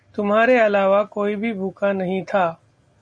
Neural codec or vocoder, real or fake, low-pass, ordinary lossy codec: none; real; 9.9 kHz; MP3, 48 kbps